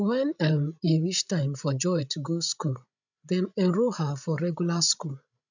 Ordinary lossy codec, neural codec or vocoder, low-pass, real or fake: none; codec, 16 kHz, 16 kbps, FreqCodec, larger model; 7.2 kHz; fake